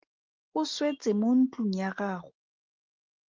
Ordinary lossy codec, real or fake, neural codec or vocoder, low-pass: Opus, 16 kbps; real; none; 7.2 kHz